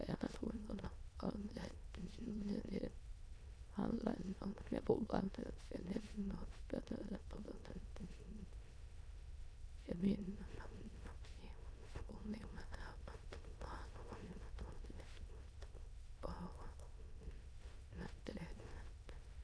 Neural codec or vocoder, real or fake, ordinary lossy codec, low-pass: autoencoder, 22.05 kHz, a latent of 192 numbers a frame, VITS, trained on many speakers; fake; none; none